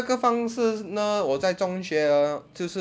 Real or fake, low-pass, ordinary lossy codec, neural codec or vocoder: real; none; none; none